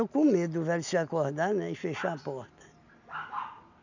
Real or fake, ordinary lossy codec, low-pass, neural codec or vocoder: real; none; 7.2 kHz; none